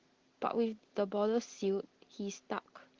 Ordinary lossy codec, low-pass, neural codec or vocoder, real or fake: Opus, 16 kbps; 7.2 kHz; none; real